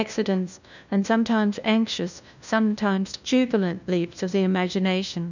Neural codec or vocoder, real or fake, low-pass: codec, 16 kHz, 1 kbps, FunCodec, trained on LibriTTS, 50 frames a second; fake; 7.2 kHz